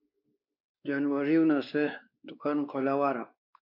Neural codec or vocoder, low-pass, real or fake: codec, 16 kHz, 2 kbps, X-Codec, WavLM features, trained on Multilingual LibriSpeech; 5.4 kHz; fake